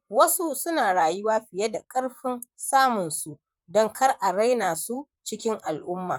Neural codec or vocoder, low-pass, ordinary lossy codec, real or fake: vocoder, 44.1 kHz, 128 mel bands, Pupu-Vocoder; 19.8 kHz; none; fake